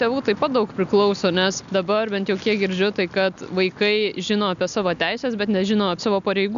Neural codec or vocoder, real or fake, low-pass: none; real; 7.2 kHz